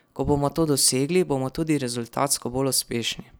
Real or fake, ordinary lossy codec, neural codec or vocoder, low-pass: real; none; none; none